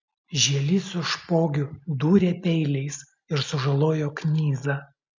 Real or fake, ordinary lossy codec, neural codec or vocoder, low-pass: real; MP3, 64 kbps; none; 7.2 kHz